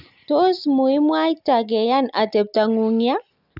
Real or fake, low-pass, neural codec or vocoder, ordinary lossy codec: real; 5.4 kHz; none; none